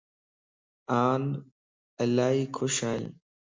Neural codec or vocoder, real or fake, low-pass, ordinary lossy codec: none; real; 7.2 kHz; MP3, 64 kbps